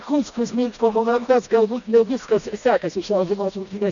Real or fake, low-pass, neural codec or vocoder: fake; 7.2 kHz; codec, 16 kHz, 1 kbps, FreqCodec, smaller model